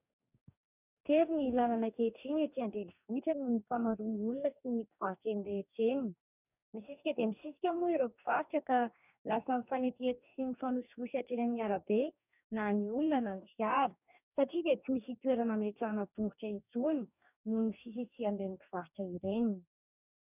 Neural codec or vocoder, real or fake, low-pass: codec, 44.1 kHz, 2.6 kbps, DAC; fake; 3.6 kHz